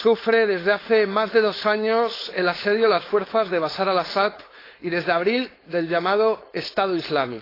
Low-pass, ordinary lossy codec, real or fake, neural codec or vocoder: 5.4 kHz; AAC, 24 kbps; fake; codec, 16 kHz, 4.8 kbps, FACodec